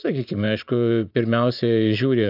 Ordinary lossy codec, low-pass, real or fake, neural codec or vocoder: AAC, 48 kbps; 5.4 kHz; fake; vocoder, 24 kHz, 100 mel bands, Vocos